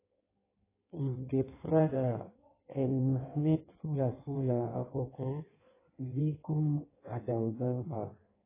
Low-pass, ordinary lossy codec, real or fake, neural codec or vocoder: 3.6 kHz; AAC, 16 kbps; fake; codec, 16 kHz in and 24 kHz out, 1.1 kbps, FireRedTTS-2 codec